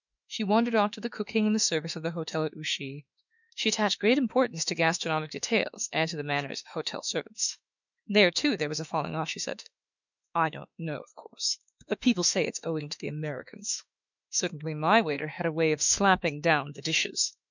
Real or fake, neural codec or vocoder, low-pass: fake; autoencoder, 48 kHz, 32 numbers a frame, DAC-VAE, trained on Japanese speech; 7.2 kHz